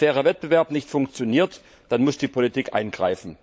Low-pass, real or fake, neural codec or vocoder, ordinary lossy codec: none; fake; codec, 16 kHz, 16 kbps, FunCodec, trained on LibriTTS, 50 frames a second; none